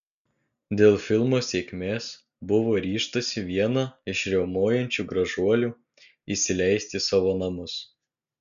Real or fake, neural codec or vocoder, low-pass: real; none; 7.2 kHz